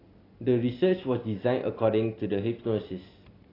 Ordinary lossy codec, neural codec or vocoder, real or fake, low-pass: AAC, 24 kbps; none; real; 5.4 kHz